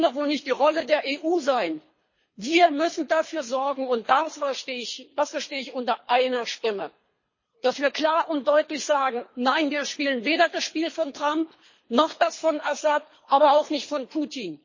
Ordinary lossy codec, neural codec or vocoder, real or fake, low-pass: MP3, 32 kbps; codec, 24 kHz, 3 kbps, HILCodec; fake; 7.2 kHz